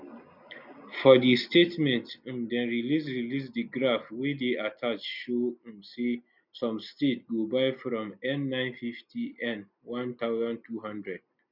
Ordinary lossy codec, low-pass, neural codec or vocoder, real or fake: none; 5.4 kHz; none; real